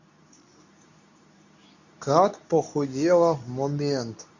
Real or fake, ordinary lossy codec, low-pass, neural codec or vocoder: fake; none; 7.2 kHz; codec, 24 kHz, 0.9 kbps, WavTokenizer, medium speech release version 2